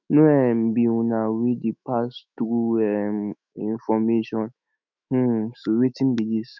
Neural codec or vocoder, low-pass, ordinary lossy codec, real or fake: autoencoder, 48 kHz, 128 numbers a frame, DAC-VAE, trained on Japanese speech; 7.2 kHz; none; fake